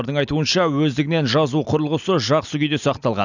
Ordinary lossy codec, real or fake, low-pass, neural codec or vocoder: none; real; 7.2 kHz; none